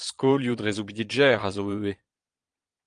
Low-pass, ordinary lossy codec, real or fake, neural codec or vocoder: 9.9 kHz; Opus, 32 kbps; fake; vocoder, 22.05 kHz, 80 mel bands, Vocos